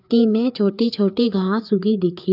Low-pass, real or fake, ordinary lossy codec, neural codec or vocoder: 5.4 kHz; fake; none; codec, 16 kHz, 4 kbps, FreqCodec, larger model